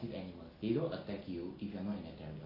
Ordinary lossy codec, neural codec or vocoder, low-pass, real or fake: AAC, 24 kbps; none; 5.4 kHz; real